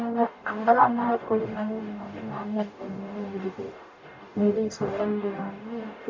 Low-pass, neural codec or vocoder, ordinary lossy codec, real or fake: 7.2 kHz; codec, 44.1 kHz, 0.9 kbps, DAC; MP3, 48 kbps; fake